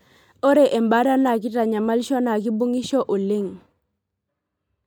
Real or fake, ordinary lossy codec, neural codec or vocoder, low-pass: real; none; none; none